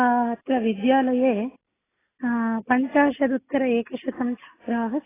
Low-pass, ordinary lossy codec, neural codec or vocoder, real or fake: 3.6 kHz; AAC, 16 kbps; none; real